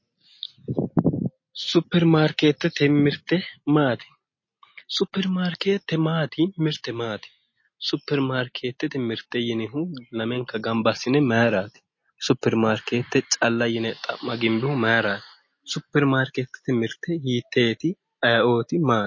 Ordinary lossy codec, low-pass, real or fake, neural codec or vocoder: MP3, 32 kbps; 7.2 kHz; real; none